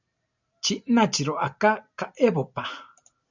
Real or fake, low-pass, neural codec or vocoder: real; 7.2 kHz; none